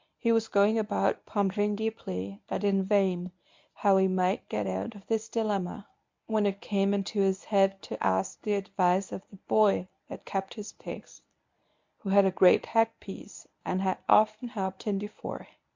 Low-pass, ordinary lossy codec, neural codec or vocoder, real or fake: 7.2 kHz; MP3, 64 kbps; codec, 24 kHz, 0.9 kbps, WavTokenizer, medium speech release version 1; fake